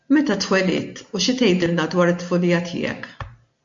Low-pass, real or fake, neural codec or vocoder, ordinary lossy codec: 7.2 kHz; real; none; MP3, 48 kbps